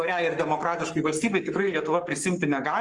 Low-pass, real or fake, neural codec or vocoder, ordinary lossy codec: 10.8 kHz; fake; codec, 44.1 kHz, 7.8 kbps, Pupu-Codec; Opus, 24 kbps